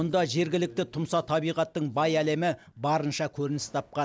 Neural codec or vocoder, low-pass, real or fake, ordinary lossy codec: none; none; real; none